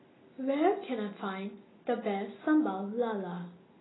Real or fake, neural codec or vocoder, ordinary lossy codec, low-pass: real; none; AAC, 16 kbps; 7.2 kHz